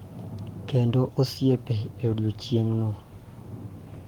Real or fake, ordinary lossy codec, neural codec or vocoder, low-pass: fake; Opus, 24 kbps; codec, 44.1 kHz, 7.8 kbps, Pupu-Codec; 19.8 kHz